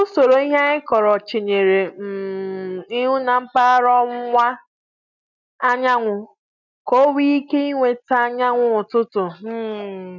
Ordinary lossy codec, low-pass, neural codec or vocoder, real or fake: none; 7.2 kHz; none; real